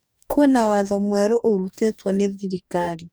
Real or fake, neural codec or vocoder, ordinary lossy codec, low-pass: fake; codec, 44.1 kHz, 2.6 kbps, DAC; none; none